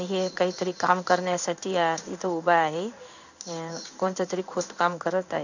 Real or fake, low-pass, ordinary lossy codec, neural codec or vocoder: fake; 7.2 kHz; none; codec, 16 kHz in and 24 kHz out, 1 kbps, XY-Tokenizer